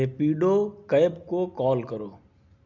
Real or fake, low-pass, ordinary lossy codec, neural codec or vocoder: real; 7.2 kHz; MP3, 64 kbps; none